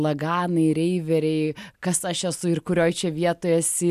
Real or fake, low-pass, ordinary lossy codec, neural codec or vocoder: real; 14.4 kHz; MP3, 96 kbps; none